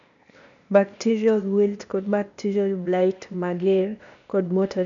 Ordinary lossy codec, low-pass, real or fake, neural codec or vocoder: none; 7.2 kHz; fake; codec, 16 kHz, 0.8 kbps, ZipCodec